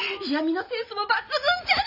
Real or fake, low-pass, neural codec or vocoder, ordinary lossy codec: real; 5.4 kHz; none; none